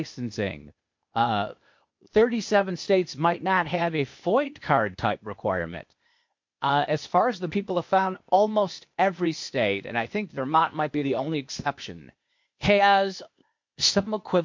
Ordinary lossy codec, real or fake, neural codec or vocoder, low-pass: MP3, 48 kbps; fake; codec, 16 kHz, 0.8 kbps, ZipCodec; 7.2 kHz